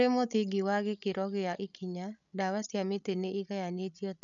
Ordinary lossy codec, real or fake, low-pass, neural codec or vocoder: none; fake; 7.2 kHz; codec, 16 kHz, 16 kbps, FreqCodec, larger model